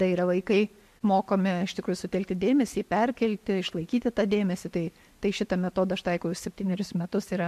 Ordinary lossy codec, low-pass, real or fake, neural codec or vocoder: MP3, 64 kbps; 14.4 kHz; real; none